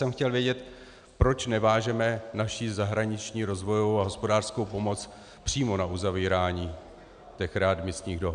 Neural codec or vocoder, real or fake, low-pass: none; real; 9.9 kHz